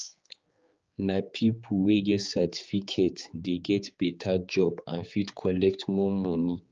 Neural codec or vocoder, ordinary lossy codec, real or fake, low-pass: codec, 16 kHz, 4 kbps, X-Codec, HuBERT features, trained on general audio; Opus, 24 kbps; fake; 7.2 kHz